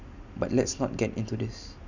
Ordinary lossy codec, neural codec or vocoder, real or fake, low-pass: none; none; real; 7.2 kHz